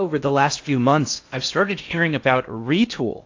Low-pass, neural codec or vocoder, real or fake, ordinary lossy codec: 7.2 kHz; codec, 16 kHz in and 24 kHz out, 0.6 kbps, FocalCodec, streaming, 2048 codes; fake; AAC, 48 kbps